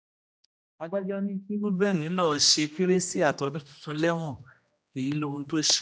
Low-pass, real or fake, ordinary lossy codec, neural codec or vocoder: none; fake; none; codec, 16 kHz, 1 kbps, X-Codec, HuBERT features, trained on general audio